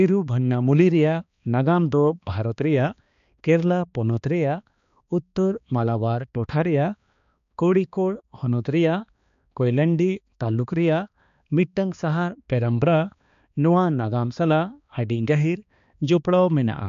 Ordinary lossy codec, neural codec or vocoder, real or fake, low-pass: MP3, 64 kbps; codec, 16 kHz, 2 kbps, X-Codec, HuBERT features, trained on balanced general audio; fake; 7.2 kHz